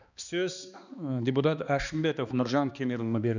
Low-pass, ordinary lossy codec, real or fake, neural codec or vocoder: 7.2 kHz; none; fake; codec, 16 kHz, 2 kbps, X-Codec, HuBERT features, trained on balanced general audio